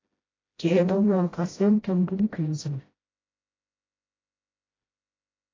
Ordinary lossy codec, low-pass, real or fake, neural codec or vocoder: AAC, 32 kbps; 7.2 kHz; fake; codec, 16 kHz, 0.5 kbps, FreqCodec, smaller model